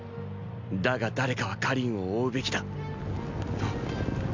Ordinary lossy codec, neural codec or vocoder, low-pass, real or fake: MP3, 64 kbps; none; 7.2 kHz; real